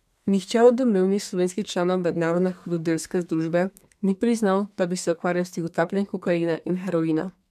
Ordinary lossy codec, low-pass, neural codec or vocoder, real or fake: none; 14.4 kHz; codec, 32 kHz, 1.9 kbps, SNAC; fake